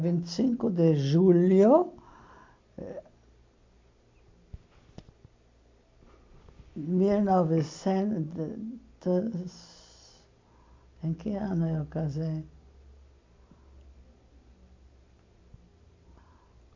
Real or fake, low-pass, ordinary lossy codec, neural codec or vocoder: real; 7.2 kHz; MP3, 48 kbps; none